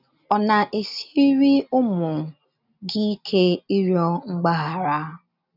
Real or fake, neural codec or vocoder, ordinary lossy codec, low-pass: real; none; none; 5.4 kHz